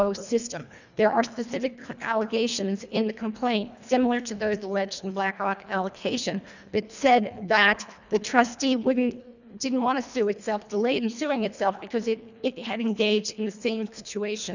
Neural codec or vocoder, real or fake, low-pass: codec, 24 kHz, 1.5 kbps, HILCodec; fake; 7.2 kHz